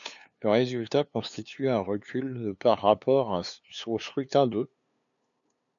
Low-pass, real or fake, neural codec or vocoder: 7.2 kHz; fake; codec, 16 kHz, 2 kbps, FunCodec, trained on LibriTTS, 25 frames a second